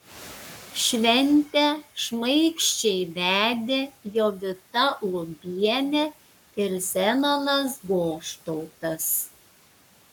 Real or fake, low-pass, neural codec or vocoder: fake; 19.8 kHz; codec, 44.1 kHz, 7.8 kbps, Pupu-Codec